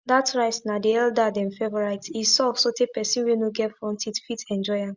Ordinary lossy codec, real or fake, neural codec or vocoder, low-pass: Opus, 64 kbps; real; none; 7.2 kHz